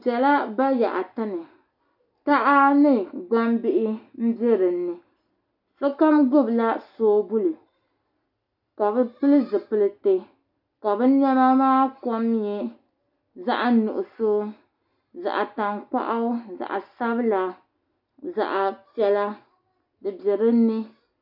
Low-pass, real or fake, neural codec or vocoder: 5.4 kHz; real; none